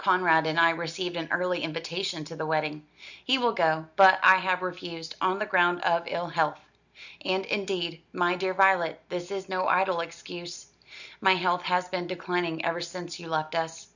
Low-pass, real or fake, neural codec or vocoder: 7.2 kHz; real; none